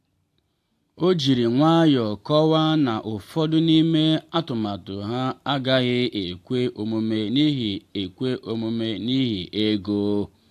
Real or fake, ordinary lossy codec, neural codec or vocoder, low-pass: real; AAC, 64 kbps; none; 14.4 kHz